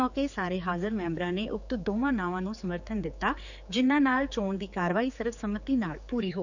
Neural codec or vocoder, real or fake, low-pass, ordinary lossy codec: codec, 16 kHz, 4 kbps, X-Codec, HuBERT features, trained on general audio; fake; 7.2 kHz; none